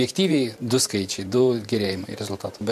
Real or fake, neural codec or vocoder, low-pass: fake; vocoder, 44.1 kHz, 128 mel bands every 512 samples, BigVGAN v2; 14.4 kHz